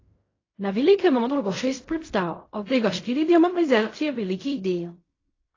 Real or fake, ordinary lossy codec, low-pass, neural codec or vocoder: fake; AAC, 32 kbps; 7.2 kHz; codec, 16 kHz in and 24 kHz out, 0.4 kbps, LongCat-Audio-Codec, fine tuned four codebook decoder